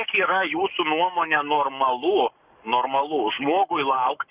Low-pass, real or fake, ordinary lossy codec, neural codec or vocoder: 3.6 kHz; fake; Opus, 64 kbps; codec, 44.1 kHz, 7.8 kbps, Pupu-Codec